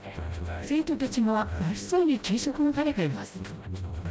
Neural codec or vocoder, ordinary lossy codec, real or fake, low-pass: codec, 16 kHz, 0.5 kbps, FreqCodec, smaller model; none; fake; none